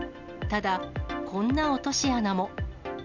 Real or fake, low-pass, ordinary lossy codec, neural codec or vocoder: real; 7.2 kHz; none; none